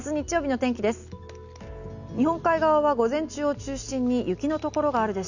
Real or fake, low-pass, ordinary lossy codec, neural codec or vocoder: real; 7.2 kHz; none; none